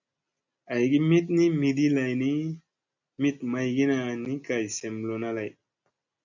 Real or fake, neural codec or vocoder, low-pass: real; none; 7.2 kHz